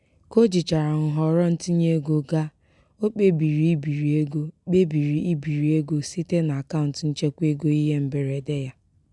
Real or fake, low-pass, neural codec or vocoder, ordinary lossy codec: real; 10.8 kHz; none; none